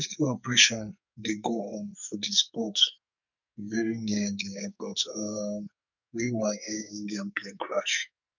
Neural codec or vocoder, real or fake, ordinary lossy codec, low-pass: codec, 44.1 kHz, 2.6 kbps, SNAC; fake; none; 7.2 kHz